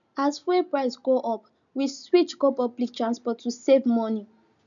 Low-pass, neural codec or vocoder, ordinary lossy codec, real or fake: 7.2 kHz; none; none; real